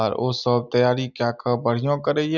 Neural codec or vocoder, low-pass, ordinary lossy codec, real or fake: none; 7.2 kHz; none; real